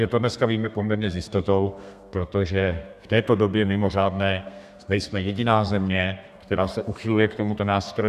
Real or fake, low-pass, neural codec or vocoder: fake; 14.4 kHz; codec, 32 kHz, 1.9 kbps, SNAC